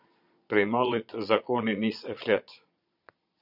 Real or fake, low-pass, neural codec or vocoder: fake; 5.4 kHz; vocoder, 22.05 kHz, 80 mel bands, Vocos